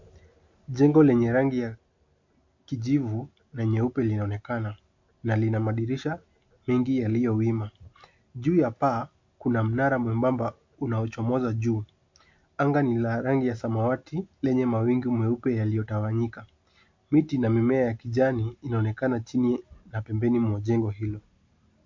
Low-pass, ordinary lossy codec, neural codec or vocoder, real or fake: 7.2 kHz; MP3, 48 kbps; none; real